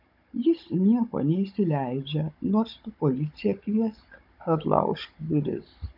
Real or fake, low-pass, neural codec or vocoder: fake; 5.4 kHz; codec, 16 kHz, 16 kbps, FunCodec, trained on Chinese and English, 50 frames a second